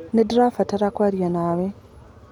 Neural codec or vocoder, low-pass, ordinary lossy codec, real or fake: none; 19.8 kHz; none; real